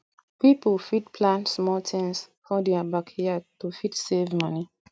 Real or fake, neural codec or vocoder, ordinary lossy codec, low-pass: real; none; none; none